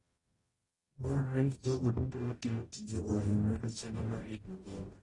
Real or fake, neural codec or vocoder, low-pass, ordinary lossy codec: fake; codec, 44.1 kHz, 0.9 kbps, DAC; 10.8 kHz; AAC, 32 kbps